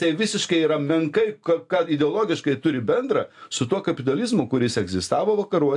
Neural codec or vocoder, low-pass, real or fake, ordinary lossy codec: none; 10.8 kHz; real; AAC, 64 kbps